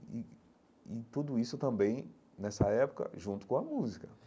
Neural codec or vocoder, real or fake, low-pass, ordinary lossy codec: none; real; none; none